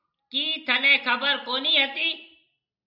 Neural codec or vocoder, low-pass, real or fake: none; 5.4 kHz; real